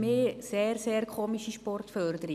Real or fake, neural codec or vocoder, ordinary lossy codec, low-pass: real; none; none; 14.4 kHz